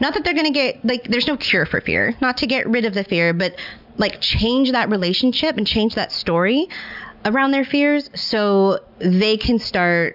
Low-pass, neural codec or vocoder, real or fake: 5.4 kHz; none; real